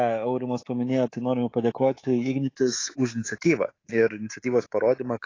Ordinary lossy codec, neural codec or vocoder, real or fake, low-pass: AAC, 32 kbps; codec, 24 kHz, 3.1 kbps, DualCodec; fake; 7.2 kHz